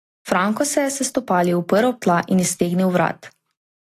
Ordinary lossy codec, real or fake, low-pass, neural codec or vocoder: AAC, 48 kbps; real; 14.4 kHz; none